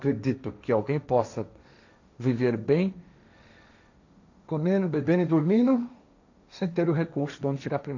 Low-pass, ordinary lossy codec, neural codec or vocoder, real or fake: 7.2 kHz; none; codec, 16 kHz, 1.1 kbps, Voila-Tokenizer; fake